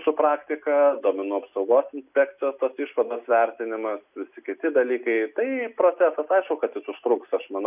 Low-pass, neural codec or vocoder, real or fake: 3.6 kHz; none; real